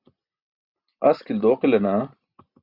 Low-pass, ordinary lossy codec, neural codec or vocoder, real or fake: 5.4 kHz; Opus, 64 kbps; none; real